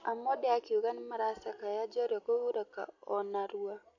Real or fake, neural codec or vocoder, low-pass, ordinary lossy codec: real; none; 7.2 kHz; AAC, 48 kbps